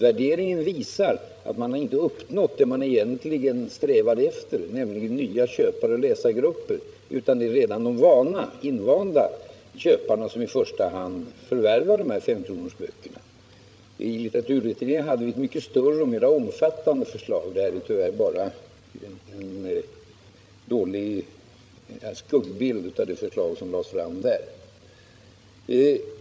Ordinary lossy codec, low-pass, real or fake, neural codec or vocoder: none; none; fake; codec, 16 kHz, 16 kbps, FreqCodec, larger model